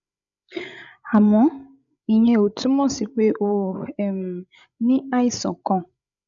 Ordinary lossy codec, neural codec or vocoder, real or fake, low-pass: none; codec, 16 kHz, 16 kbps, FreqCodec, larger model; fake; 7.2 kHz